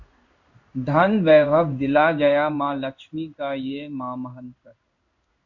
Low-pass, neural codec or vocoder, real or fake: 7.2 kHz; codec, 16 kHz in and 24 kHz out, 1 kbps, XY-Tokenizer; fake